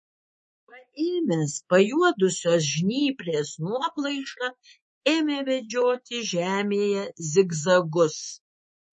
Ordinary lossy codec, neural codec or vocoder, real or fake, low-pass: MP3, 32 kbps; codec, 24 kHz, 3.1 kbps, DualCodec; fake; 10.8 kHz